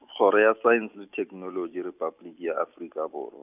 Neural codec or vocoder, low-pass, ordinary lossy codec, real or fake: none; 3.6 kHz; none; real